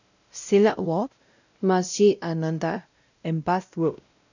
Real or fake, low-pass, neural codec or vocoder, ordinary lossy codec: fake; 7.2 kHz; codec, 16 kHz, 0.5 kbps, X-Codec, WavLM features, trained on Multilingual LibriSpeech; none